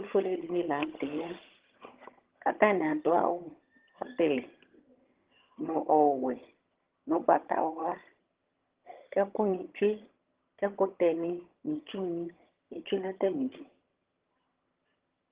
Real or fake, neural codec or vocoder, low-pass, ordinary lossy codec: fake; vocoder, 22.05 kHz, 80 mel bands, HiFi-GAN; 3.6 kHz; Opus, 16 kbps